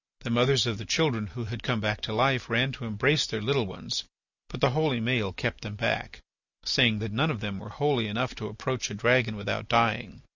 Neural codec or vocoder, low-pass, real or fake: none; 7.2 kHz; real